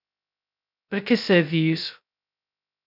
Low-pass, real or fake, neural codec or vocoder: 5.4 kHz; fake; codec, 16 kHz, 0.2 kbps, FocalCodec